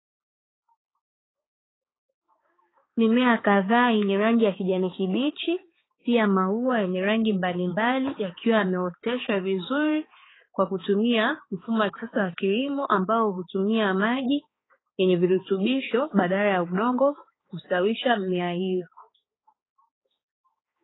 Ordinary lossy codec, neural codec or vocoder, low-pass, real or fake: AAC, 16 kbps; codec, 16 kHz, 4 kbps, X-Codec, HuBERT features, trained on balanced general audio; 7.2 kHz; fake